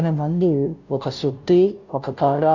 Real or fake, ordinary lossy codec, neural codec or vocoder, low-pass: fake; none; codec, 16 kHz, 0.5 kbps, FunCodec, trained on Chinese and English, 25 frames a second; 7.2 kHz